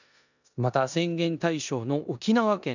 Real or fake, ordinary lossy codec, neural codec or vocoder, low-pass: fake; none; codec, 16 kHz in and 24 kHz out, 0.9 kbps, LongCat-Audio-Codec, four codebook decoder; 7.2 kHz